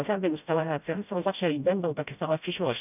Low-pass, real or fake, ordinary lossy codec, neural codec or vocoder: 3.6 kHz; fake; none; codec, 16 kHz, 0.5 kbps, FreqCodec, smaller model